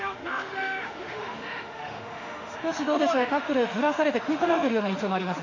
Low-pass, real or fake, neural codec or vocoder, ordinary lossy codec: 7.2 kHz; fake; autoencoder, 48 kHz, 32 numbers a frame, DAC-VAE, trained on Japanese speech; AAC, 48 kbps